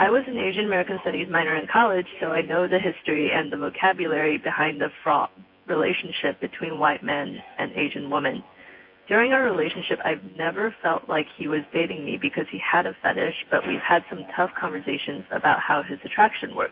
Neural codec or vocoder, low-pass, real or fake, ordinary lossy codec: vocoder, 24 kHz, 100 mel bands, Vocos; 5.4 kHz; fake; MP3, 32 kbps